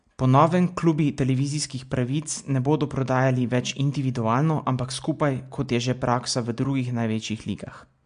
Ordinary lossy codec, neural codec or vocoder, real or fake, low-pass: MP3, 64 kbps; none; real; 9.9 kHz